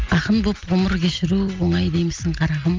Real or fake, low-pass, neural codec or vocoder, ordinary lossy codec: real; 7.2 kHz; none; Opus, 24 kbps